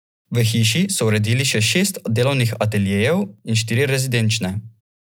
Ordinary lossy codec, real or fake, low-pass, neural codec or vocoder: none; real; none; none